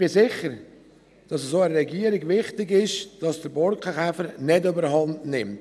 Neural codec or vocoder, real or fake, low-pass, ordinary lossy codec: none; real; none; none